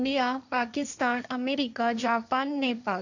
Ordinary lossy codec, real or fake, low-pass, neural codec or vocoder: none; fake; 7.2 kHz; codec, 16 kHz, 1.1 kbps, Voila-Tokenizer